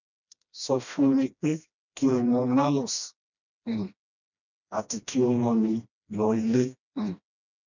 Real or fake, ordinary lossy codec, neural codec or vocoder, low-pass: fake; none; codec, 16 kHz, 1 kbps, FreqCodec, smaller model; 7.2 kHz